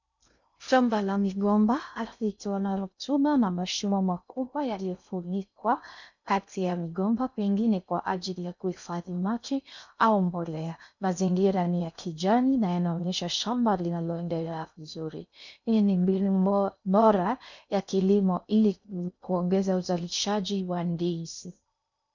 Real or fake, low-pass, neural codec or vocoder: fake; 7.2 kHz; codec, 16 kHz in and 24 kHz out, 0.6 kbps, FocalCodec, streaming, 2048 codes